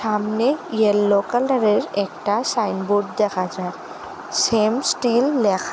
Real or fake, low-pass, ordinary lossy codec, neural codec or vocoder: real; none; none; none